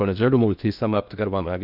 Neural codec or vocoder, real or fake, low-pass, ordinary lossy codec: codec, 16 kHz in and 24 kHz out, 0.8 kbps, FocalCodec, streaming, 65536 codes; fake; 5.4 kHz; none